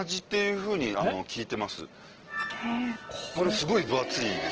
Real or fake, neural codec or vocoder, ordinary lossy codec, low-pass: real; none; Opus, 16 kbps; 7.2 kHz